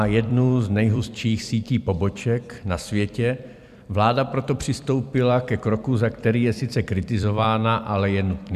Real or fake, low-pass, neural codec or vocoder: fake; 14.4 kHz; vocoder, 44.1 kHz, 128 mel bands every 256 samples, BigVGAN v2